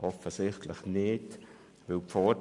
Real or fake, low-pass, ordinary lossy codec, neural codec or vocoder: real; 10.8 kHz; MP3, 96 kbps; none